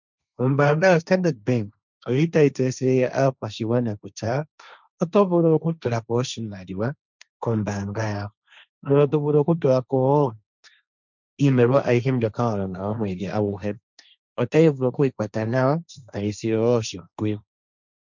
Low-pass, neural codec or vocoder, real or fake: 7.2 kHz; codec, 16 kHz, 1.1 kbps, Voila-Tokenizer; fake